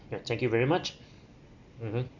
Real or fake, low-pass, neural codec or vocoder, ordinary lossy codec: real; 7.2 kHz; none; none